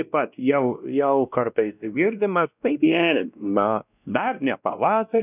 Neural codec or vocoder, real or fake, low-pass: codec, 16 kHz, 1 kbps, X-Codec, WavLM features, trained on Multilingual LibriSpeech; fake; 3.6 kHz